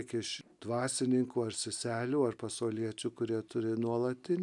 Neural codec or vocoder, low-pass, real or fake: none; 10.8 kHz; real